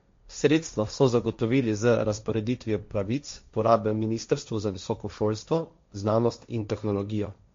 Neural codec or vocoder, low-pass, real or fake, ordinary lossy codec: codec, 16 kHz, 1.1 kbps, Voila-Tokenizer; 7.2 kHz; fake; MP3, 48 kbps